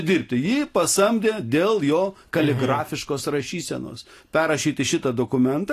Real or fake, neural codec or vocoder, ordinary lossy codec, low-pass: real; none; AAC, 48 kbps; 14.4 kHz